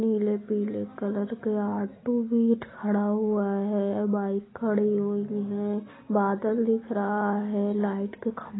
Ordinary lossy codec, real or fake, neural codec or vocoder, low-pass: AAC, 16 kbps; real; none; 7.2 kHz